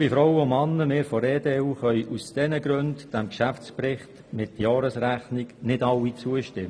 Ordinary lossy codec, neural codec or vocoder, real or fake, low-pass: none; none; real; none